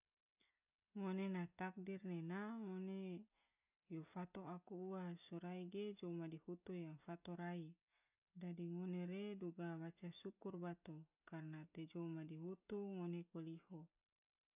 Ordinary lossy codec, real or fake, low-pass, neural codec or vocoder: none; real; 3.6 kHz; none